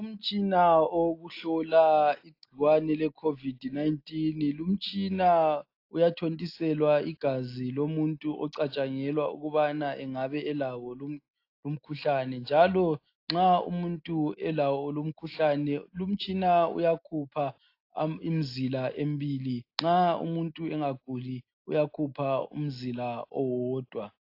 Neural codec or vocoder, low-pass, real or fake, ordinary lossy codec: none; 5.4 kHz; real; AAC, 32 kbps